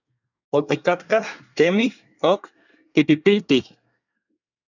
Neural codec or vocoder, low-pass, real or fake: codec, 24 kHz, 1 kbps, SNAC; 7.2 kHz; fake